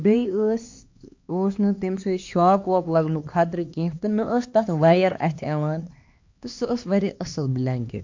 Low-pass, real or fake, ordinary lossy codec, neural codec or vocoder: 7.2 kHz; fake; MP3, 48 kbps; codec, 16 kHz, 2 kbps, X-Codec, HuBERT features, trained on LibriSpeech